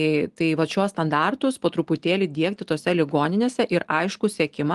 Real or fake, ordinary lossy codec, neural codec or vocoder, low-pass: real; Opus, 24 kbps; none; 10.8 kHz